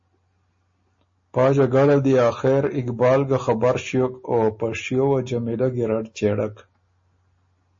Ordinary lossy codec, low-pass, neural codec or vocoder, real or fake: MP3, 32 kbps; 7.2 kHz; none; real